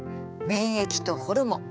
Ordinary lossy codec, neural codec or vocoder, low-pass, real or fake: none; codec, 16 kHz, 4 kbps, X-Codec, HuBERT features, trained on balanced general audio; none; fake